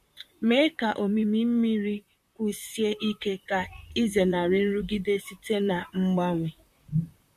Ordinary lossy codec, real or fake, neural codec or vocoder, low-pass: MP3, 64 kbps; fake; vocoder, 44.1 kHz, 128 mel bands, Pupu-Vocoder; 14.4 kHz